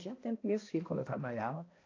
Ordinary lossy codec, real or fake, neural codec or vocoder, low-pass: AAC, 32 kbps; fake; codec, 16 kHz, 1 kbps, X-Codec, HuBERT features, trained on balanced general audio; 7.2 kHz